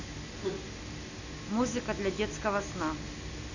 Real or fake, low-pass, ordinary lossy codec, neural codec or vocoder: real; 7.2 kHz; none; none